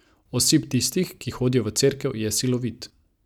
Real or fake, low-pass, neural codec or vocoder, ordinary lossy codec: real; 19.8 kHz; none; none